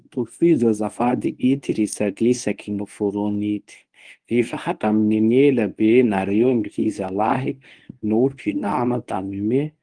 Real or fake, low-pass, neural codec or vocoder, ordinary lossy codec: fake; 9.9 kHz; codec, 24 kHz, 0.9 kbps, WavTokenizer, medium speech release version 1; Opus, 24 kbps